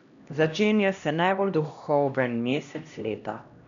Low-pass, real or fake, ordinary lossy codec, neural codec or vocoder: 7.2 kHz; fake; none; codec, 16 kHz, 1 kbps, X-Codec, HuBERT features, trained on LibriSpeech